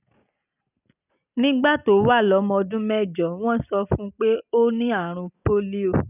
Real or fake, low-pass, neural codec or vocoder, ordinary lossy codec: real; 3.6 kHz; none; none